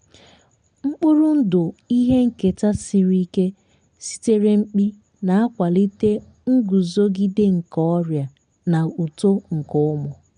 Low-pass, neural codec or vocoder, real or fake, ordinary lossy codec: 9.9 kHz; none; real; MP3, 64 kbps